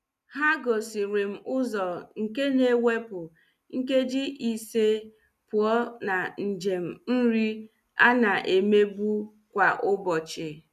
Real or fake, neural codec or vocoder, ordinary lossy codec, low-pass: real; none; none; 14.4 kHz